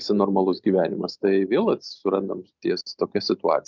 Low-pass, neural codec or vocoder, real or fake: 7.2 kHz; none; real